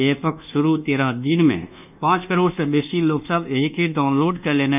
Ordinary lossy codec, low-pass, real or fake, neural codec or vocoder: none; 3.6 kHz; fake; codec, 24 kHz, 1.2 kbps, DualCodec